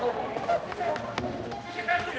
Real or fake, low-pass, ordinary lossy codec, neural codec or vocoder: fake; none; none; codec, 16 kHz, 1 kbps, X-Codec, HuBERT features, trained on balanced general audio